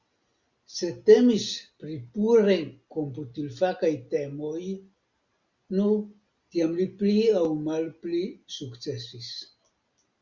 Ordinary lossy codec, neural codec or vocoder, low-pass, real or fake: Opus, 64 kbps; none; 7.2 kHz; real